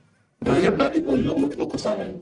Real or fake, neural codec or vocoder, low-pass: fake; codec, 44.1 kHz, 1.7 kbps, Pupu-Codec; 10.8 kHz